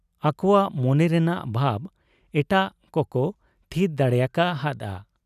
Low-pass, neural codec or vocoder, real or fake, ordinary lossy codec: 14.4 kHz; none; real; none